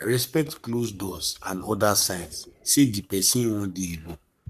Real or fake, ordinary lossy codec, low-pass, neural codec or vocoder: fake; none; 14.4 kHz; codec, 44.1 kHz, 3.4 kbps, Pupu-Codec